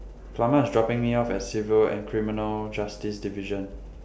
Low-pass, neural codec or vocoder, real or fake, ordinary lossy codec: none; none; real; none